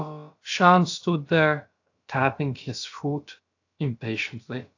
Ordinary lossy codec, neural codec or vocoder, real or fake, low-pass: AAC, 48 kbps; codec, 16 kHz, about 1 kbps, DyCAST, with the encoder's durations; fake; 7.2 kHz